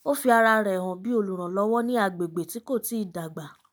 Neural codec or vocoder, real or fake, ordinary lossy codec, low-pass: none; real; none; none